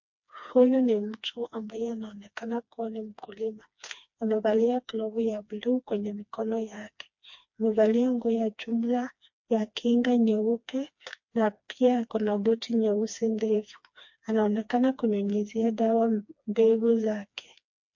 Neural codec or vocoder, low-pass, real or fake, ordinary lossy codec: codec, 16 kHz, 2 kbps, FreqCodec, smaller model; 7.2 kHz; fake; MP3, 48 kbps